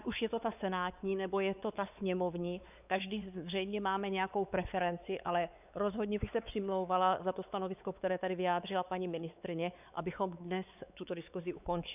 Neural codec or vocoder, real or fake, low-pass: codec, 16 kHz, 4 kbps, X-Codec, WavLM features, trained on Multilingual LibriSpeech; fake; 3.6 kHz